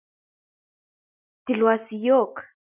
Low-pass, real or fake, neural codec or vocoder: 3.6 kHz; real; none